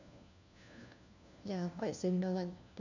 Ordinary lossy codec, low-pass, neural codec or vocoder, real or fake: none; 7.2 kHz; codec, 16 kHz, 1 kbps, FunCodec, trained on LibriTTS, 50 frames a second; fake